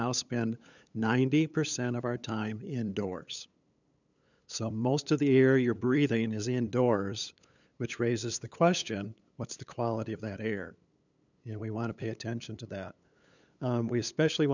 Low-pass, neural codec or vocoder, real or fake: 7.2 kHz; codec, 16 kHz, 8 kbps, FunCodec, trained on LibriTTS, 25 frames a second; fake